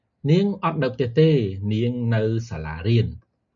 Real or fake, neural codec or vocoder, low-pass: real; none; 7.2 kHz